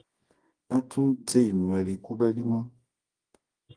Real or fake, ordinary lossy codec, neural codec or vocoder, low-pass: fake; Opus, 24 kbps; codec, 24 kHz, 0.9 kbps, WavTokenizer, medium music audio release; 9.9 kHz